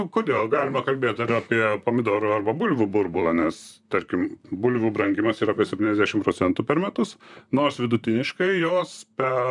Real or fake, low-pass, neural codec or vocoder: fake; 10.8 kHz; vocoder, 44.1 kHz, 128 mel bands, Pupu-Vocoder